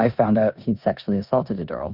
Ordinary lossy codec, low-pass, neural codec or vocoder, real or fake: Opus, 64 kbps; 5.4 kHz; codec, 16 kHz in and 24 kHz out, 0.9 kbps, LongCat-Audio-Codec, four codebook decoder; fake